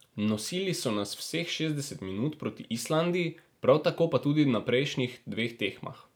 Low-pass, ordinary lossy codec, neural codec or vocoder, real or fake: none; none; none; real